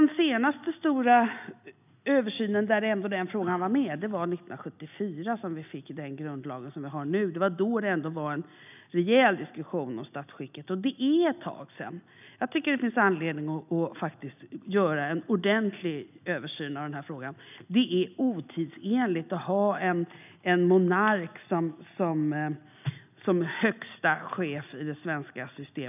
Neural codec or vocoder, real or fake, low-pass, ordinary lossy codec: none; real; 3.6 kHz; none